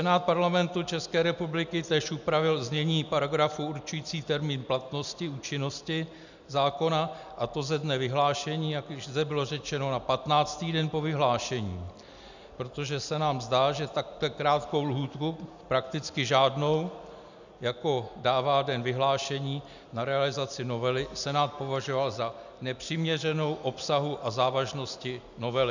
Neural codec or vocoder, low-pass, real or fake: none; 7.2 kHz; real